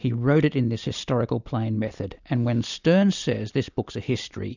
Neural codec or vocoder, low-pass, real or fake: none; 7.2 kHz; real